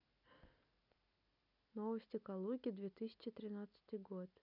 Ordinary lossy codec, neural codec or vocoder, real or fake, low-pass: none; none; real; 5.4 kHz